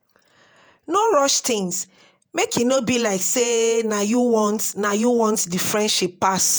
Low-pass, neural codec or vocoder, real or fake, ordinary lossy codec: none; vocoder, 48 kHz, 128 mel bands, Vocos; fake; none